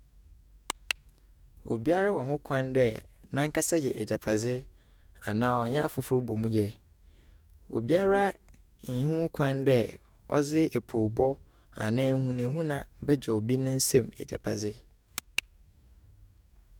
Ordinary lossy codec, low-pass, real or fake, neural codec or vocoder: none; 19.8 kHz; fake; codec, 44.1 kHz, 2.6 kbps, DAC